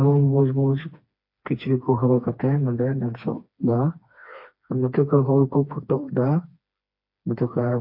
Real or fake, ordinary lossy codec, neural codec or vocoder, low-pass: fake; MP3, 32 kbps; codec, 16 kHz, 2 kbps, FreqCodec, smaller model; 5.4 kHz